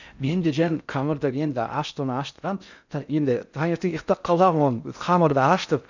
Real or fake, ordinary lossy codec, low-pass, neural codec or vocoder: fake; none; 7.2 kHz; codec, 16 kHz in and 24 kHz out, 0.6 kbps, FocalCodec, streaming, 4096 codes